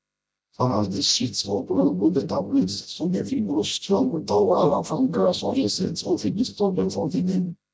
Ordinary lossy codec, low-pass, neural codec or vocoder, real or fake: none; none; codec, 16 kHz, 0.5 kbps, FreqCodec, smaller model; fake